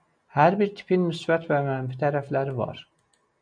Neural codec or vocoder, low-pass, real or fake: none; 9.9 kHz; real